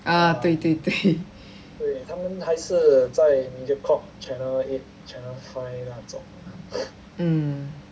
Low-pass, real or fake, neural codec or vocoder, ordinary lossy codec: none; real; none; none